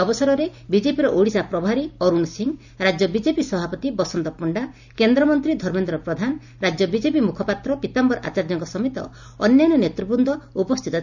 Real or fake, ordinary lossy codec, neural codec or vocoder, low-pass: real; none; none; 7.2 kHz